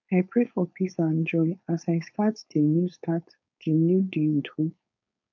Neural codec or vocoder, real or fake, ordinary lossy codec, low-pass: codec, 16 kHz, 4.8 kbps, FACodec; fake; none; 7.2 kHz